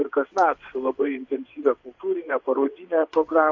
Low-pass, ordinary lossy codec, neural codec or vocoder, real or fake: 7.2 kHz; MP3, 48 kbps; vocoder, 22.05 kHz, 80 mel bands, WaveNeXt; fake